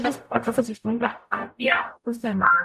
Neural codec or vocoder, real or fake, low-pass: codec, 44.1 kHz, 0.9 kbps, DAC; fake; 14.4 kHz